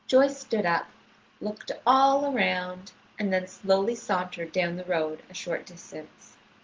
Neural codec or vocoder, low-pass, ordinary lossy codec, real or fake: none; 7.2 kHz; Opus, 16 kbps; real